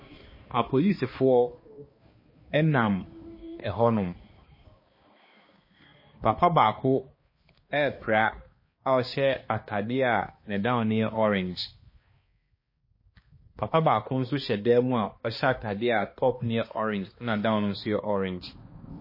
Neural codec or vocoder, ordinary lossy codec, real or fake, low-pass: codec, 16 kHz, 2 kbps, X-Codec, HuBERT features, trained on balanced general audio; MP3, 24 kbps; fake; 5.4 kHz